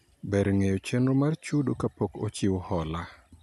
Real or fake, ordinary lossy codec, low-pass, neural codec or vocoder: real; none; 14.4 kHz; none